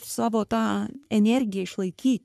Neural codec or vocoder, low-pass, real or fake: codec, 44.1 kHz, 3.4 kbps, Pupu-Codec; 14.4 kHz; fake